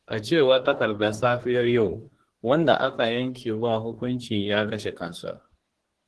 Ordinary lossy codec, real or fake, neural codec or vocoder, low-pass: Opus, 16 kbps; fake; codec, 24 kHz, 1 kbps, SNAC; 10.8 kHz